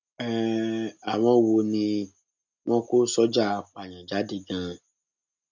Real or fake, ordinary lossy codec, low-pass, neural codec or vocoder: real; none; 7.2 kHz; none